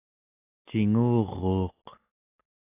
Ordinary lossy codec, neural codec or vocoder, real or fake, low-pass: AAC, 24 kbps; none; real; 3.6 kHz